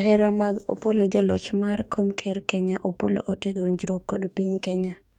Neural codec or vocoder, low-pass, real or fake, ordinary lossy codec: codec, 44.1 kHz, 2.6 kbps, DAC; 19.8 kHz; fake; none